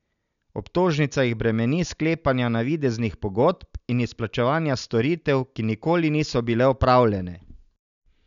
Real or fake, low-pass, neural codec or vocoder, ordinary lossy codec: real; 7.2 kHz; none; none